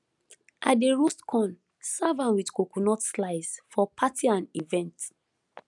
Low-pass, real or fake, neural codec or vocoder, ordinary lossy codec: 10.8 kHz; real; none; none